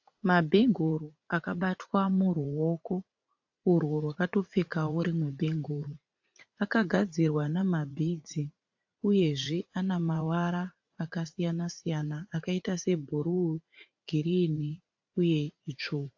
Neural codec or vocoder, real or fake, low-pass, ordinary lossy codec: vocoder, 44.1 kHz, 80 mel bands, Vocos; fake; 7.2 kHz; AAC, 48 kbps